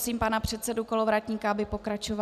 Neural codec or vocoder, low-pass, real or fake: none; 14.4 kHz; real